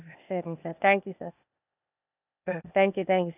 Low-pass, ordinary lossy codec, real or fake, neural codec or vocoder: 3.6 kHz; none; fake; codec, 16 kHz, 0.8 kbps, ZipCodec